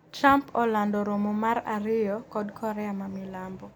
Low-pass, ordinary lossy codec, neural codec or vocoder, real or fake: none; none; none; real